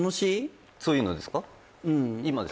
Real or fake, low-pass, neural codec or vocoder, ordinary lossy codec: real; none; none; none